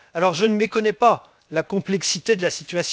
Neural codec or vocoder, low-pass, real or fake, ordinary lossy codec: codec, 16 kHz, 0.7 kbps, FocalCodec; none; fake; none